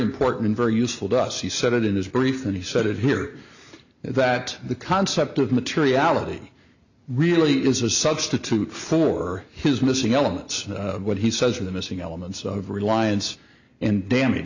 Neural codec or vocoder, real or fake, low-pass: none; real; 7.2 kHz